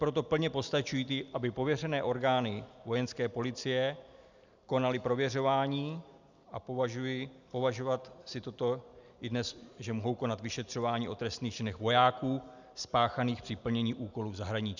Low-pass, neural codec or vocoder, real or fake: 7.2 kHz; none; real